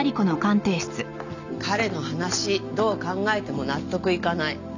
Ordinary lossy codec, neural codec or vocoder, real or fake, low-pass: none; none; real; 7.2 kHz